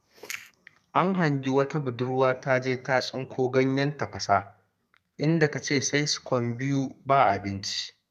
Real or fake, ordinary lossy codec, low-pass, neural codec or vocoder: fake; none; 14.4 kHz; codec, 32 kHz, 1.9 kbps, SNAC